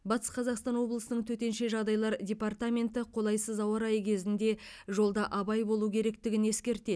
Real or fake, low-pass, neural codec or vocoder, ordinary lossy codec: real; none; none; none